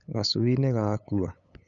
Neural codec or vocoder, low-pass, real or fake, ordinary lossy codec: codec, 16 kHz, 8 kbps, FunCodec, trained on LibriTTS, 25 frames a second; 7.2 kHz; fake; none